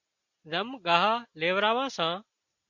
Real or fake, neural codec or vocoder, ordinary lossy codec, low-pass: real; none; MP3, 48 kbps; 7.2 kHz